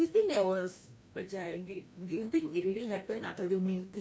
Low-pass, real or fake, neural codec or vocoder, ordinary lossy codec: none; fake; codec, 16 kHz, 1 kbps, FreqCodec, larger model; none